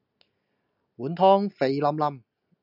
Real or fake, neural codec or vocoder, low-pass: real; none; 5.4 kHz